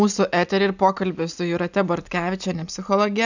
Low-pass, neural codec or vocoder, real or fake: 7.2 kHz; none; real